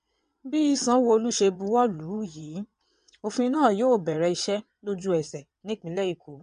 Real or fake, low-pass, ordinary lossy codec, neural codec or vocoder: fake; 9.9 kHz; MP3, 64 kbps; vocoder, 22.05 kHz, 80 mel bands, WaveNeXt